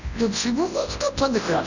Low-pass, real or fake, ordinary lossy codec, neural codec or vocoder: 7.2 kHz; fake; none; codec, 24 kHz, 0.9 kbps, WavTokenizer, large speech release